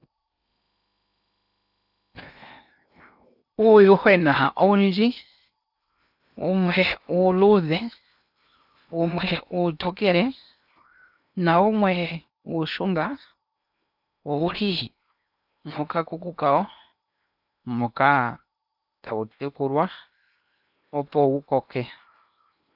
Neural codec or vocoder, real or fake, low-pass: codec, 16 kHz in and 24 kHz out, 0.8 kbps, FocalCodec, streaming, 65536 codes; fake; 5.4 kHz